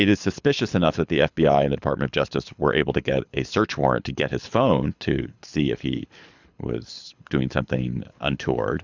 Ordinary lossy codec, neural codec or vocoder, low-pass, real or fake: Opus, 64 kbps; codec, 24 kHz, 6 kbps, HILCodec; 7.2 kHz; fake